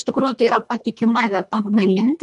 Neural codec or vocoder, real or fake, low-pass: codec, 24 kHz, 1.5 kbps, HILCodec; fake; 10.8 kHz